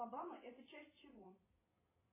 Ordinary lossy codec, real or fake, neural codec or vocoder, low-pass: MP3, 16 kbps; fake; vocoder, 22.05 kHz, 80 mel bands, WaveNeXt; 3.6 kHz